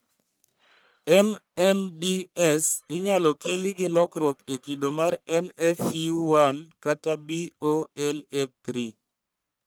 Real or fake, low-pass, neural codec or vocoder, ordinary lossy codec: fake; none; codec, 44.1 kHz, 1.7 kbps, Pupu-Codec; none